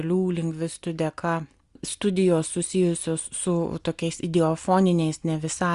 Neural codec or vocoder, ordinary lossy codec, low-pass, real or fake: none; Opus, 64 kbps; 10.8 kHz; real